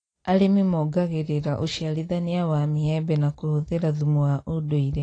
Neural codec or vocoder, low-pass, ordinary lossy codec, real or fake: none; 9.9 kHz; AAC, 32 kbps; real